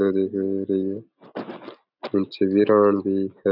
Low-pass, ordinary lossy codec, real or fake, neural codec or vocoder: 5.4 kHz; none; real; none